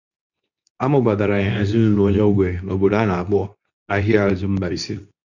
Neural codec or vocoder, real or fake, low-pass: codec, 24 kHz, 0.9 kbps, WavTokenizer, medium speech release version 2; fake; 7.2 kHz